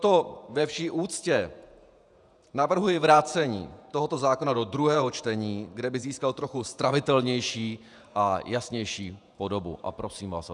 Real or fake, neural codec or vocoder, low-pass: fake; vocoder, 48 kHz, 128 mel bands, Vocos; 10.8 kHz